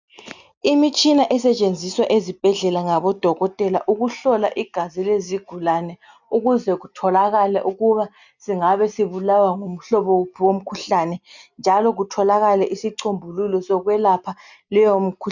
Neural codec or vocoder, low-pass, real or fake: none; 7.2 kHz; real